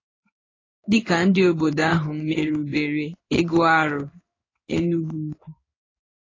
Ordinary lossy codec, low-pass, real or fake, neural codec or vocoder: AAC, 32 kbps; 7.2 kHz; fake; codec, 16 kHz in and 24 kHz out, 1 kbps, XY-Tokenizer